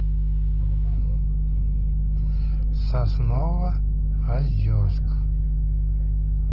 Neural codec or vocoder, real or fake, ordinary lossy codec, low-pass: none; real; Opus, 16 kbps; 5.4 kHz